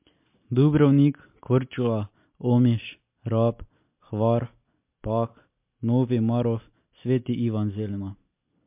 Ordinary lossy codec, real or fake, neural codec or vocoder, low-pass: MP3, 32 kbps; real; none; 3.6 kHz